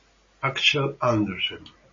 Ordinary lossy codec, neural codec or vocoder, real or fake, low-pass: MP3, 32 kbps; none; real; 7.2 kHz